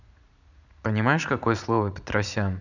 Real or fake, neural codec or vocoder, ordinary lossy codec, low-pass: fake; vocoder, 44.1 kHz, 80 mel bands, Vocos; none; 7.2 kHz